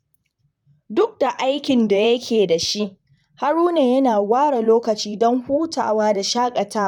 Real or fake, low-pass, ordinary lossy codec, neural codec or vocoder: fake; 19.8 kHz; none; vocoder, 44.1 kHz, 128 mel bands, Pupu-Vocoder